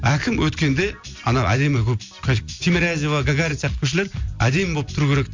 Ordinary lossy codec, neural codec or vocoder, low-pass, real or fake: MP3, 64 kbps; none; 7.2 kHz; real